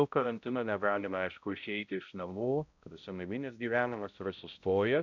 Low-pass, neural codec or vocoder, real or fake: 7.2 kHz; codec, 16 kHz, 0.5 kbps, X-Codec, HuBERT features, trained on balanced general audio; fake